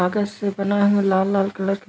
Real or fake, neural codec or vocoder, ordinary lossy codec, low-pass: real; none; none; none